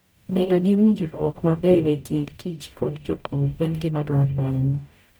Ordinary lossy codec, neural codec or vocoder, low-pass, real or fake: none; codec, 44.1 kHz, 0.9 kbps, DAC; none; fake